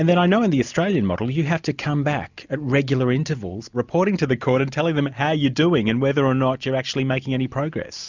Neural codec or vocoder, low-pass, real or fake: none; 7.2 kHz; real